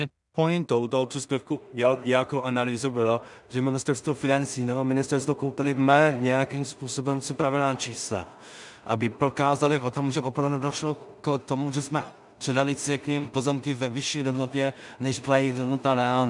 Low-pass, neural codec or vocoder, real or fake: 10.8 kHz; codec, 16 kHz in and 24 kHz out, 0.4 kbps, LongCat-Audio-Codec, two codebook decoder; fake